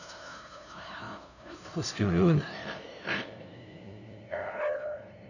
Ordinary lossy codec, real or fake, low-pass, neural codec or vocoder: none; fake; 7.2 kHz; codec, 16 kHz, 0.5 kbps, FunCodec, trained on LibriTTS, 25 frames a second